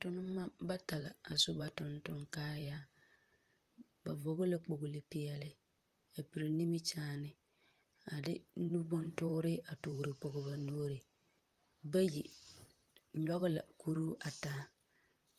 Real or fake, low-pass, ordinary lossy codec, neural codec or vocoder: fake; 14.4 kHz; Opus, 32 kbps; vocoder, 44.1 kHz, 128 mel bands, Pupu-Vocoder